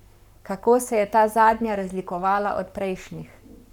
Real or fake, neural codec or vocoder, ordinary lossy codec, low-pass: fake; codec, 44.1 kHz, 7.8 kbps, Pupu-Codec; none; 19.8 kHz